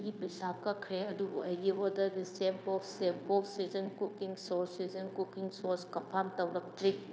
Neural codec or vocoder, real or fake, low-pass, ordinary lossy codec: codec, 16 kHz, 0.9 kbps, LongCat-Audio-Codec; fake; none; none